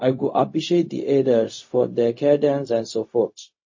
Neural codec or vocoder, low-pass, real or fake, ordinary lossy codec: codec, 16 kHz, 0.4 kbps, LongCat-Audio-Codec; 7.2 kHz; fake; MP3, 32 kbps